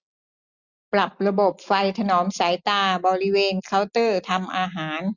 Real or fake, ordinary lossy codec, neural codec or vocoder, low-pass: real; none; none; 7.2 kHz